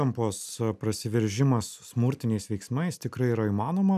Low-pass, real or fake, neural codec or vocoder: 14.4 kHz; real; none